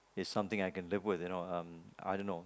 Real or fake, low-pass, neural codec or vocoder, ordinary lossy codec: real; none; none; none